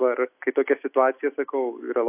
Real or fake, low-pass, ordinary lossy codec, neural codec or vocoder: real; 3.6 kHz; AAC, 24 kbps; none